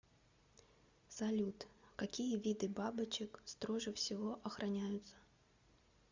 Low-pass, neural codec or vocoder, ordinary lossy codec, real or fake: 7.2 kHz; none; Opus, 64 kbps; real